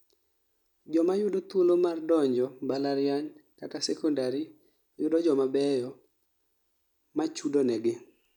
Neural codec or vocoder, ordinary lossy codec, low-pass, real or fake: none; none; 19.8 kHz; real